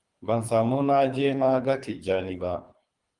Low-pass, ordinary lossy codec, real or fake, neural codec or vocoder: 10.8 kHz; Opus, 32 kbps; fake; codec, 24 kHz, 3 kbps, HILCodec